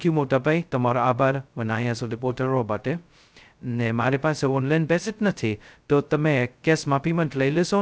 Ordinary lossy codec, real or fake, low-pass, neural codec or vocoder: none; fake; none; codec, 16 kHz, 0.2 kbps, FocalCodec